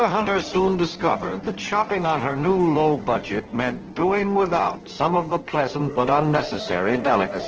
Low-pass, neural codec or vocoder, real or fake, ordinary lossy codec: 7.2 kHz; codec, 16 kHz in and 24 kHz out, 1.1 kbps, FireRedTTS-2 codec; fake; Opus, 16 kbps